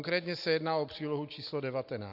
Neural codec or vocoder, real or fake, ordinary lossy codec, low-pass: none; real; AAC, 48 kbps; 5.4 kHz